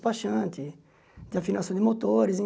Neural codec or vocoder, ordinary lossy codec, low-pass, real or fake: none; none; none; real